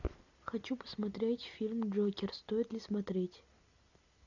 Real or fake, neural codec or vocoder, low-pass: real; none; 7.2 kHz